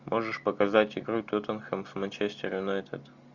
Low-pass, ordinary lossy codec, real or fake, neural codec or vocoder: 7.2 kHz; Opus, 64 kbps; real; none